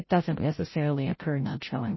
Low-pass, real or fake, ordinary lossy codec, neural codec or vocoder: 7.2 kHz; fake; MP3, 24 kbps; codec, 16 kHz, 0.5 kbps, FreqCodec, larger model